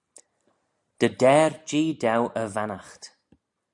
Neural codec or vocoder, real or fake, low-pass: none; real; 10.8 kHz